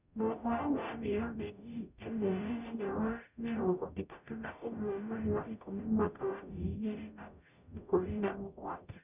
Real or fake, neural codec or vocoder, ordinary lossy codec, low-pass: fake; codec, 44.1 kHz, 0.9 kbps, DAC; none; 3.6 kHz